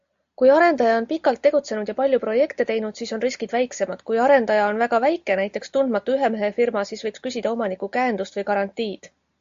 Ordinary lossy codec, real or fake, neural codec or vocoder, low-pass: AAC, 64 kbps; real; none; 7.2 kHz